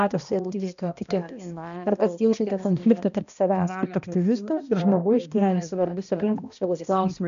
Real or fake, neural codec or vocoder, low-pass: fake; codec, 16 kHz, 1 kbps, X-Codec, HuBERT features, trained on balanced general audio; 7.2 kHz